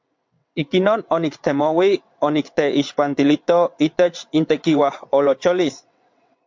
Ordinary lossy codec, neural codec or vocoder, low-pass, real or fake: MP3, 64 kbps; vocoder, 22.05 kHz, 80 mel bands, WaveNeXt; 7.2 kHz; fake